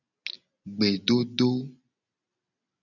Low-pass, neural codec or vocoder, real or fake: 7.2 kHz; none; real